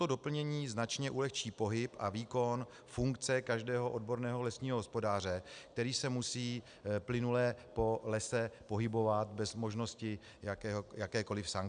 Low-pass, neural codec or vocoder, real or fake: 9.9 kHz; none; real